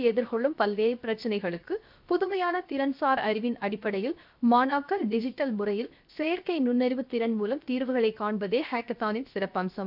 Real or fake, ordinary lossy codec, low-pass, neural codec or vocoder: fake; MP3, 48 kbps; 5.4 kHz; codec, 16 kHz, 0.7 kbps, FocalCodec